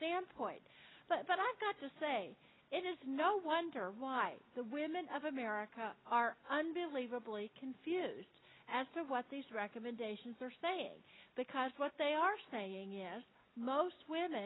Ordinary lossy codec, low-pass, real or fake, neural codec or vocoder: AAC, 16 kbps; 7.2 kHz; real; none